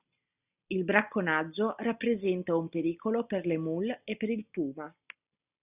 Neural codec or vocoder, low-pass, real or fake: none; 3.6 kHz; real